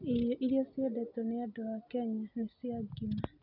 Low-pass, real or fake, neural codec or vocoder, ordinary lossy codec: 5.4 kHz; real; none; none